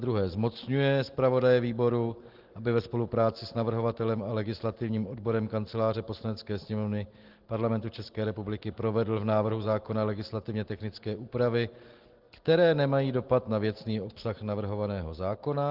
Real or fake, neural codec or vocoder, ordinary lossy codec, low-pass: real; none; Opus, 16 kbps; 5.4 kHz